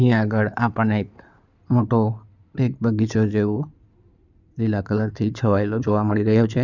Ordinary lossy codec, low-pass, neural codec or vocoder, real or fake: none; 7.2 kHz; codec, 16 kHz in and 24 kHz out, 2.2 kbps, FireRedTTS-2 codec; fake